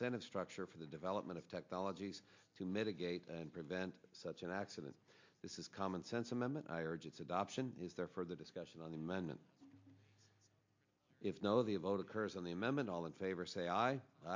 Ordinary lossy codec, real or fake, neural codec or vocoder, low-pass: MP3, 48 kbps; real; none; 7.2 kHz